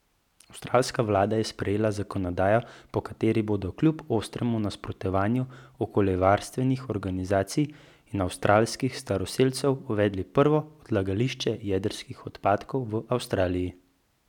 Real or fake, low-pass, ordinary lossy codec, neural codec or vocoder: real; 19.8 kHz; none; none